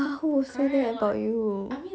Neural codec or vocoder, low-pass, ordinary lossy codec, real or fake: none; none; none; real